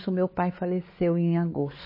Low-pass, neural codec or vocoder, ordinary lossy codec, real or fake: 5.4 kHz; codec, 16 kHz, 4 kbps, X-Codec, WavLM features, trained on Multilingual LibriSpeech; MP3, 24 kbps; fake